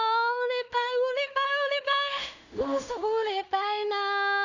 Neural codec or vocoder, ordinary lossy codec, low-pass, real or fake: codec, 16 kHz in and 24 kHz out, 0.4 kbps, LongCat-Audio-Codec, two codebook decoder; none; 7.2 kHz; fake